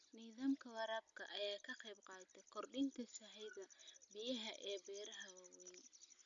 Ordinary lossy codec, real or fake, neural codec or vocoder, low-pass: none; real; none; 7.2 kHz